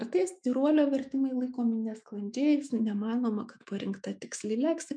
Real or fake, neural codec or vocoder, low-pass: fake; autoencoder, 48 kHz, 128 numbers a frame, DAC-VAE, trained on Japanese speech; 9.9 kHz